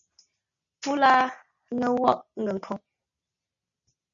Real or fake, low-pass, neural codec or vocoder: real; 7.2 kHz; none